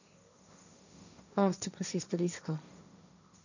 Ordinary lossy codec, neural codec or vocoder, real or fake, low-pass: none; codec, 16 kHz, 1.1 kbps, Voila-Tokenizer; fake; 7.2 kHz